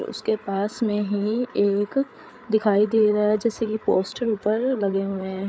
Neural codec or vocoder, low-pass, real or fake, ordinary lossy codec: codec, 16 kHz, 8 kbps, FreqCodec, larger model; none; fake; none